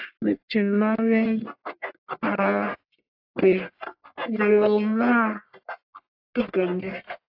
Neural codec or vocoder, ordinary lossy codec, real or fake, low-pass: codec, 44.1 kHz, 1.7 kbps, Pupu-Codec; AAC, 48 kbps; fake; 5.4 kHz